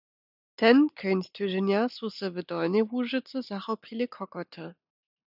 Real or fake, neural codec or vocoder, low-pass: real; none; 5.4 kHz